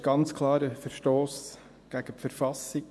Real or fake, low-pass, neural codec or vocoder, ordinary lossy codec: fake; none; vocoder, 24 kHz, 100 mel bands, Vocos; none